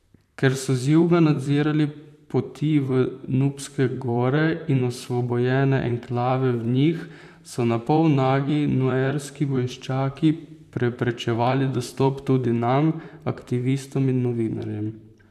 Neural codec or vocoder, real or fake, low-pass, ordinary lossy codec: vocoder, 44.1 kHz, 128 mel bands, Pupu-Vocoder; fake; 14.4 kHz; none